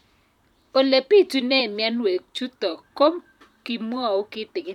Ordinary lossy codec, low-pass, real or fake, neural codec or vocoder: none; 19.8 kHz; fake; vocoder, 44.1 kHz, 128 mel bands, Pupu-Vocoder